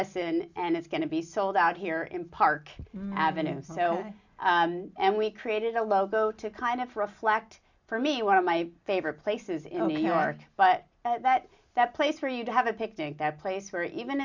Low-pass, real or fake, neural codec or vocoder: 7.2 kHz; real; none